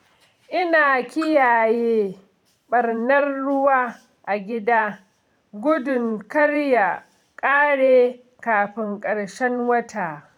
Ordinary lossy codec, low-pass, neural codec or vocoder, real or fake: none; 19.8 kHz; vocoder, 44.1 kHz, 128 mel bands every 512 samples, BigVGAN v2; fake